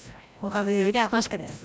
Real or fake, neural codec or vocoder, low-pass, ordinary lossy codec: fake; codec, 16 kHz, 0.5 kbps, FreqCodec, larger model; none; none